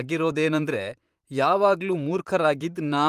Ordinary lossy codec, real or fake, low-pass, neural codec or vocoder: Opus, 32 kbps; fake; 14.4 kHz; vocoder, 44.1 kHz, 128 mel bands, Pupu-Vocoder